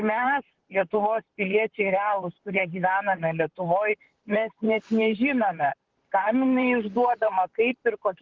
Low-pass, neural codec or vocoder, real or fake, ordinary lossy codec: 7.2 kHz; vocoder, 44.1 kHz, 128 mel bands, Pupu-Vocoder; fake; Opus, 16 kbps